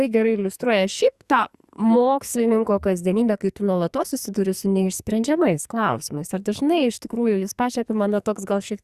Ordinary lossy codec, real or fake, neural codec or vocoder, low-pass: Opus, 64 kbps; fake; codec, 44.1 kHz, 2.6 kbps, SNAC; 14.4 kHz